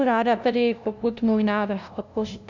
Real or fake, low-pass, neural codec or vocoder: fake; 7.2 kHz; codec, 16 kHz, 0.5 kbps, FunCodec, trained on LibriTTS, 25 frames a second